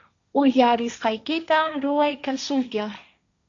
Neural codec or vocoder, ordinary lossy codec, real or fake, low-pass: codec, 16 kHz, 1.1 kbps, Voila-Tokenizer; AAC, 64 kbps; fake; 7.2 kHz